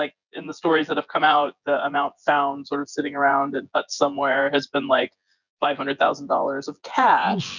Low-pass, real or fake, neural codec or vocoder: 7.2 kHz; fake; vocoder, 24 kHz, 100 mel bands, Vocos